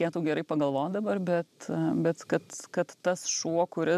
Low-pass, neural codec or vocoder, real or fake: 14.4 kHz; none; real